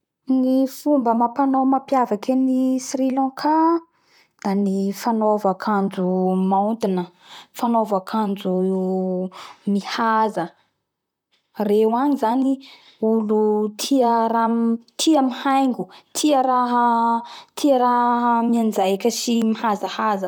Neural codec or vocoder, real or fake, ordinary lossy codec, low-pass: vocoder, 44.1 kHz, 128 mel bands, Pupu-Vocoder; fake; none; 19.8 kHz